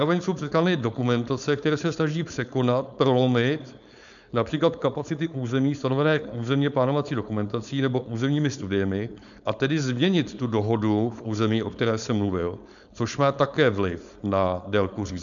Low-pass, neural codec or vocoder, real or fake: 7.2 kHz; codec, 16 kHz, 4.8 kbps, FACodec; fake